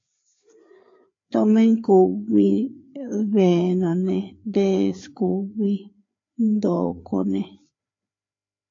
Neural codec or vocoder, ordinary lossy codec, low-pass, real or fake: codec, 16 kHz, 4 kbps, FreqCodec, larger model; AAC, 48 kbps; 7.2 kHz; fake